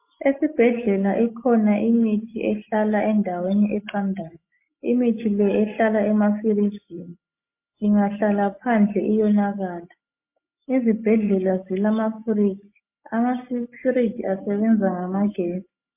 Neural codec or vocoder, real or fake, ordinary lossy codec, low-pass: none; real; MP3, 24 kbps; 3.6 kHz